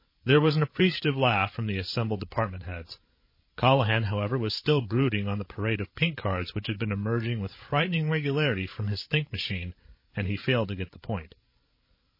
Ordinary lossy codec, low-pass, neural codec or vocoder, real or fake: MP3, 24 kbps; 5.4 kHz; codec, 16 kHz, 16 kbps, FreqCodec, larger model; fake